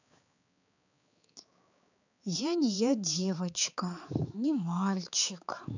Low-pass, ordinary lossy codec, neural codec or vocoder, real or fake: 7.2 kHz; none; codec, 16 kHz, 4 kbps, X-Codec, HuBERT features, trained on balanced general audio; fake